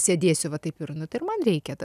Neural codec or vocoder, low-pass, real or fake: none; 14.4 kHz; real